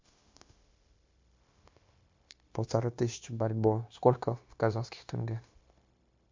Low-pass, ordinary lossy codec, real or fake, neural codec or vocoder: 7.2 kHz; MP3, 48 kbps; fake; codec, 16 kHz, 0.9 kbps, LongCat-Audio-Codec